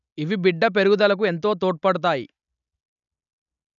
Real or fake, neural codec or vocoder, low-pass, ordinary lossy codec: real; none; 7.2 kHz; none